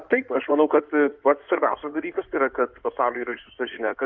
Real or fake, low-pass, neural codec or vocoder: fake; 7.2 kHz; codec, 16 kHz in and 24 kHz out, 2.2 kbps, FireRedTTS-2 codec